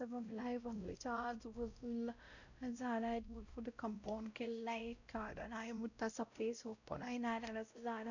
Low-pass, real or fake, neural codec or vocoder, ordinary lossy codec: 7.2 kHz; fake; codec, 16 kHz, 0.5 kbps, X-Codec, WavLM features, trained on Multilingual LibriSpeech; none